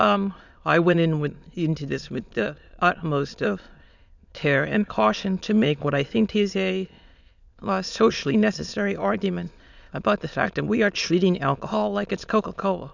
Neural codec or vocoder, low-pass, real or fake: autoencoder, 22.05 kHz, a latent of 192 numbers a frame, VITS, trained on many speakers; 7.2 kHz; fake